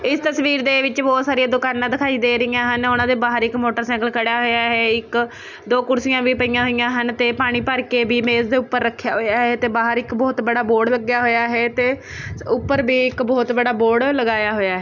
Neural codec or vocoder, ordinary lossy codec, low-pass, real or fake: none; none; 7.2 kHz; real